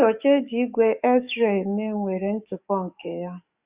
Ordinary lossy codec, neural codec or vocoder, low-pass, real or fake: Opus, 64 kbps; codec, 44.1 kHz, 7.8 kbps, DAC; 3.6 kHz; fake